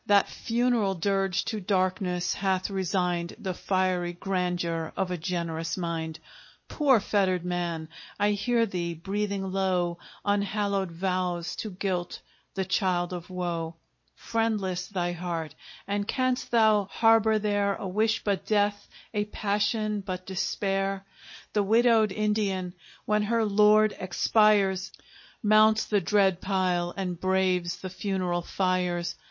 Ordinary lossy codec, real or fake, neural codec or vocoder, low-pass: MP3, 32 kbps; real; none; 7.2 kHz